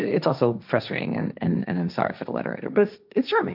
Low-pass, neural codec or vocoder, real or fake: 5.4 kHz; codec, 16 kHz, 1.1 kbps, Voila-Tokenizer; fake